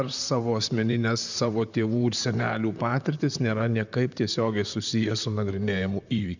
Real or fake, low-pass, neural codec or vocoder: fake; 7.2 kHz; vocoder, 44.1 kHz, 128 mel bands, Pupu-Vocoder